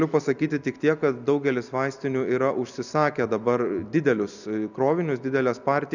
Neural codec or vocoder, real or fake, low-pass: none; real; 7.2 kHz